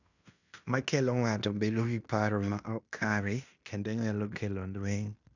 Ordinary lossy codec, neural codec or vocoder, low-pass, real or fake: none; codec, 16 kHz in and 24 kHz out, 0.9 kbps, LongCat-Audio-Codec, fine tuned four codebook decoder; 7.2 kHz; fake